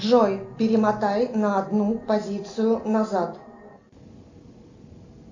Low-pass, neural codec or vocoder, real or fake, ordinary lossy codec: 7.2 kHz; none; real; AAC, 48 kbps